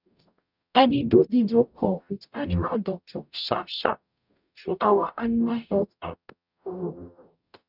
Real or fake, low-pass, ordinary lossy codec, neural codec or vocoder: fake; 5.4 kHz; none; codec, 44.1 kHz, 0.9 kbps, DAC